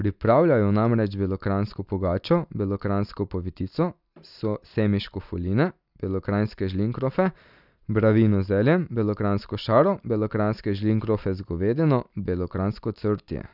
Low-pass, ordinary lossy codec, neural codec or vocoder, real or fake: 5.4 kHz; none; none; real